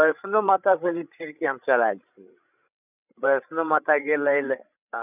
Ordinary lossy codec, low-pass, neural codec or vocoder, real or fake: none; 3.6 kHz; codec, 16 kHz, 16 kbps, FreqCodec, larger model; fake